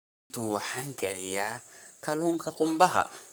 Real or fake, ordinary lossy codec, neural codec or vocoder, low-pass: fake; none; codec, 44.1 kHz, 3.4 kbps, Pupu-Codec; none